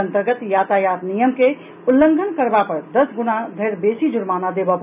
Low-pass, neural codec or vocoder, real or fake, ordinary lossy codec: 3.6 kHz; none; real; none